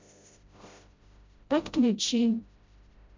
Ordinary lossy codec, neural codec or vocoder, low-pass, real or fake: MP3, 64 kbps; codec, 16 kHz, 0.5 kbps, FreqCodec, smaller model; 7.2 kHz; fake